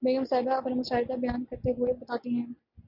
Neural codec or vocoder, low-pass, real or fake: none; 5.4 kHz; real